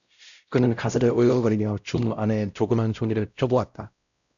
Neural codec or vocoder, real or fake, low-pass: codec, 16 kHz, 0.5 kbps, X-Codec, WavLM features, trained on Multilingual LibriSpeech; fake; 7.2 kHz